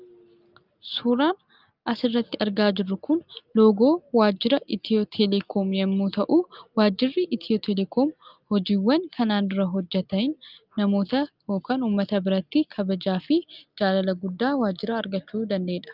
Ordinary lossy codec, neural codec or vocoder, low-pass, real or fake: Opus, 24 kbps; none; 5.4 kHz; real